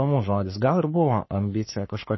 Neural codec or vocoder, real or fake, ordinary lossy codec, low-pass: codec, 44.1 kHz, 3.4 kbps, Pupu-Codec; fake; MP3, 24 kbps; 7.2 kHz